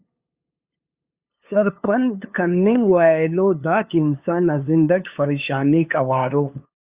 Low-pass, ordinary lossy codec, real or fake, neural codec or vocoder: 3.6 kHz; Opus, 64 kbps; fake; codec, 16 kHz, 2 kbps, FunCodec, trained on LibriTTS, 25 frames a second